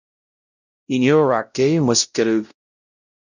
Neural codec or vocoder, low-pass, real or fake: codec, 16 kHz, 0.5 kbps, X-Codec, WavLM features, trained on Multilingual LibriSpeech; 7.2 kHz; fake